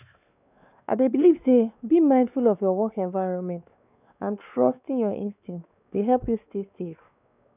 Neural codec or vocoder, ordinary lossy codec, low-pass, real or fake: codec, 16 kHz, 2 kbps, X-Codec, WavLM features, trained on Multilingual LibriSpeech; none; 3.6 kHz; fake